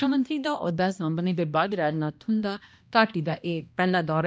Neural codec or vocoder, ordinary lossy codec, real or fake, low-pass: codec, 16 kHz, 1 kbps, X-Codec, HuBERT features, trained on balanced general audio; none; fake; none